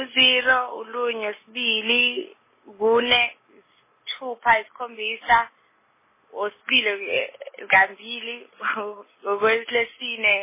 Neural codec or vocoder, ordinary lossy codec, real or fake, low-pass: none; MP3, 16 kbps; real; 3.6 kHz